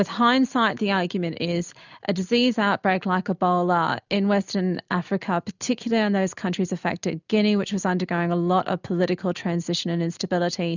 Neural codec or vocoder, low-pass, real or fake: none; 7.2 kHz; real